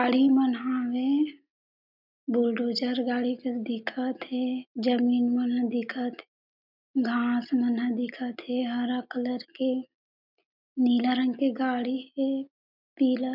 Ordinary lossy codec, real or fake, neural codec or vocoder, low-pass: none; real; none; 5.4 kHz